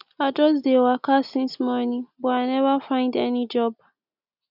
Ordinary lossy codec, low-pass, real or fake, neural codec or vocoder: none; 5.4 kHz; real; none